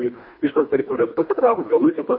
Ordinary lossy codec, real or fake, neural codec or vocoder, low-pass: MP3, 24 kbps; fake; codec, 24 kHz, 1.5 kbps, HILCodec; 5.4 kHz